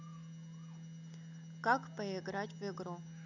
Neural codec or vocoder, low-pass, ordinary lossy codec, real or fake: none; 7.2 kHz; none; real